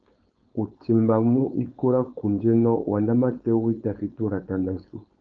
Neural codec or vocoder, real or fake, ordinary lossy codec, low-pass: codec, 16 kHz, 4.8 kbps, FACodec; fake; Opus, 24 kbps; 7.2 kHz